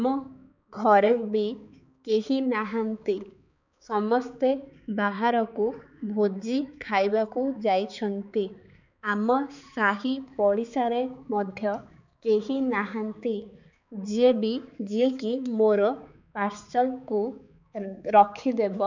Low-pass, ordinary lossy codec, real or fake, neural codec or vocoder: 7.2 kHz; none; fake; codec, 16 kHz, 4 kbps, X-Codec, HuBERT features, trained on balanced general audio